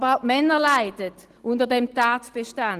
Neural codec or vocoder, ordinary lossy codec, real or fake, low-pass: none; Opus, 16 kbps; real; 14.4 kHz